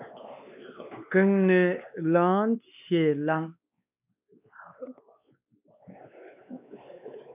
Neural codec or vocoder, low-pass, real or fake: codec, 16 kHz, 2 kbps, X-Codec, WavLM features, trained on Multilingual LibriSpeech; 3.6 kHz; fake